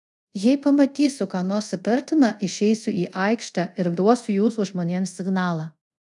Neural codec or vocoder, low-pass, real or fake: codec, 24 kHz, 0.5 kbps, DualCodec; 10.8 kHz; fake